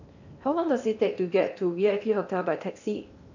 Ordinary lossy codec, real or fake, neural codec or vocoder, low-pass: none; fake; codec, 16 kHz in and 24 kHz out, 0.8 kbps, FocalCodec, streaming, 65536 codes; 7.2 kHz